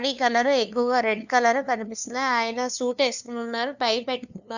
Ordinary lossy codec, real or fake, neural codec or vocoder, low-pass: none; fake; codec, 16 kHz, 2 kbps, FunCodec, trained on LibriTTS, 25 frames a second; 7.2 kHz